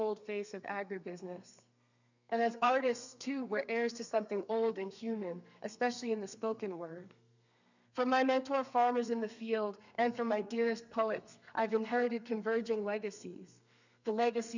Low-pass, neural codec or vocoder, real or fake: 7.2 kHz; codec, 32 kHz, 1.9 kbps, SNAC; fake